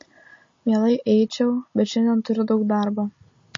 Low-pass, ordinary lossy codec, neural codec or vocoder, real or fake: 7.2 kHz; MP3, 32 kbps; none; real